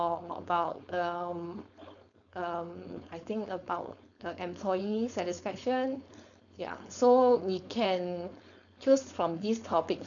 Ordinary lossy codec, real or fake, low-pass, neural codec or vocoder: none; fake; 7.2 kHz; codec, 16 kHz, 4.8 kbps, FACodec